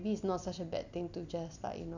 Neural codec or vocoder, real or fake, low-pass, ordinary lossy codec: none; real; 7.2 kHz; none